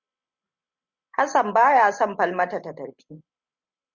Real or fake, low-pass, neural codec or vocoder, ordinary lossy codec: real; 7.2 kHz; none; Opus, 64 kbps